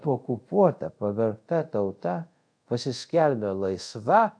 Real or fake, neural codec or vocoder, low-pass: fake; codec, 24 kHz, 0.5 kbps, DualCodec; 9.9 kHz